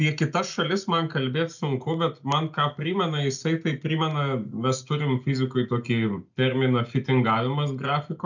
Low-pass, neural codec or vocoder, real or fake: 7.2 kHz; none; real